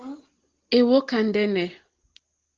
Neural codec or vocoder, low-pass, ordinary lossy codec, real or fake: none; 7.2 kHz; Opus, 16 kbps; real